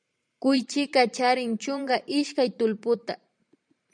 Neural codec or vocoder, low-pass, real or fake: vocoder, 44.1 kHz, 128 mel bands every 512 samples, BigVGAN v2; 9.9 kHz; fake